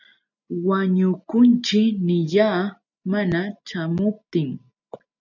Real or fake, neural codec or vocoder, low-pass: real; none; 7.2 kHz